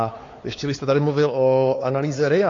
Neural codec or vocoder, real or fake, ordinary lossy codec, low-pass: codec, 16 kHz, 4 kbps, X-Codec, WavLM features, trained on Multilingual LibriSpeech; fake; AAC, 32 kbps; 7.2 kHz